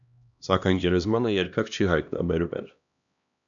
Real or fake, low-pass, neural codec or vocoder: fake; 7.2 kHz; codec, 16 kHz, 1 kbps, X-Codec, HuBERT features, trained on LibriSpeech